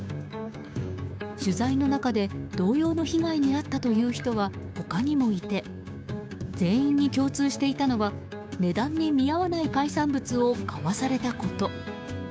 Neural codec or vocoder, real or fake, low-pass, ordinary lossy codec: codec, 16 kHz, 6 kbps, DAC; fake; none; none